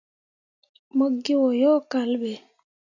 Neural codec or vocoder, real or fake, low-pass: none; real; 7.2 kHz